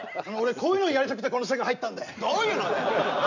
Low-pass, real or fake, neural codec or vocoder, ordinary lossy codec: 7.2 kHz; real; none; none